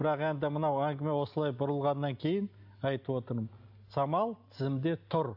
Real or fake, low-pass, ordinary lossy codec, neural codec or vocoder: real; 5.4 kHz; AAC, 48 kbps; none